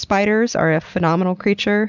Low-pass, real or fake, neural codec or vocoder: 7.2 kHz; real; none